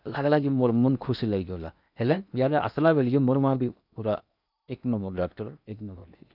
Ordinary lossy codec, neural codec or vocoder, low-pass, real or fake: none; codec, 16 kHz in and 24 kHz out, 0.8 kbps, FocalCodec, streaming, 65536 codes; 5.4 kHz; fake